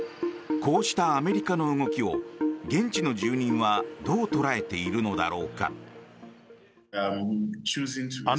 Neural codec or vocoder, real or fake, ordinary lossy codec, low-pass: none; real; none; none